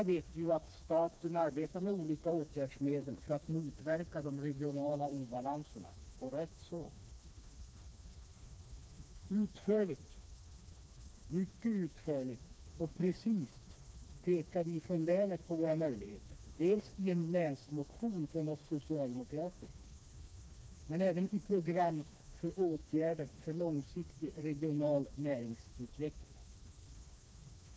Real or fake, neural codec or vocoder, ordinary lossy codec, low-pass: fake; codec, 16 kHz, 2 kbps, FreqCodec, smaller model; none; none